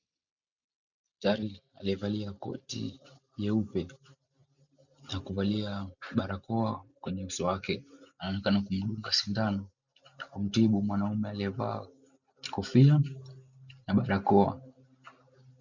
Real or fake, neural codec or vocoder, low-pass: real; none; 7.2 kHz